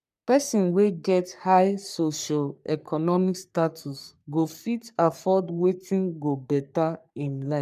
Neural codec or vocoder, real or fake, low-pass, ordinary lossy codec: codec, 44.1 kHz, 3.4 kbps, Pupu-Codec; fake; 14.4 kHz; none